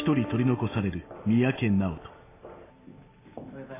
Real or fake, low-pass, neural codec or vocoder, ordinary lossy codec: real; 3.6 kHz; none; AAC, 16 kbps